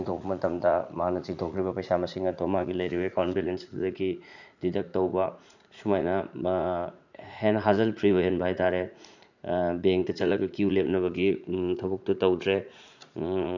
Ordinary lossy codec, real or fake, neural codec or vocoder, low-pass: none; fake; vocoder, 44.1 kHz, 80 mel bands, Vocos; 7.2 kHz